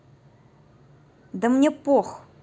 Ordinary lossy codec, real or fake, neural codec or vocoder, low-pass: none; real; none; none